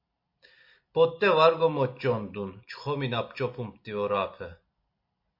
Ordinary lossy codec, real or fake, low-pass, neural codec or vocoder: MP3, 32 kbps; real; 5.4 kHz; none